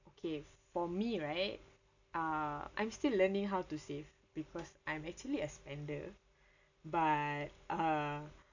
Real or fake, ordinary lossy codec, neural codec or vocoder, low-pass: real; none; none; 7.2 kHz